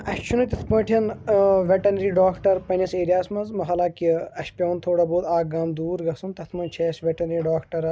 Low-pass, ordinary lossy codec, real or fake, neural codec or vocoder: none; none; real; none